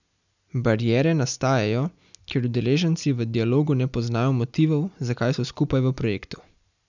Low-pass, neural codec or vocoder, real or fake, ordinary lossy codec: 7.2 kHz; none; real; none